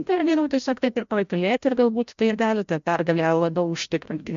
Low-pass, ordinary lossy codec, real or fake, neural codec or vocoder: 7.2 kHz; MP3, 48 kbps; fake; codec, 16 kHz, 0.5 kbps, FreqCodec, larger model